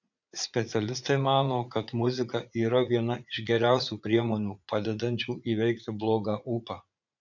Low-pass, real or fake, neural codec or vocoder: 7.2 kHz; fake; vocoder, 44.1 kHz, 80 mel bands, Vocos